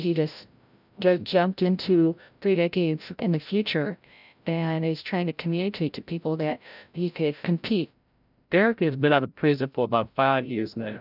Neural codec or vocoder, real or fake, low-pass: codec, 16 kHz, 0.5 kbps, FreqCodec, larger model; fake; 5.4 kHz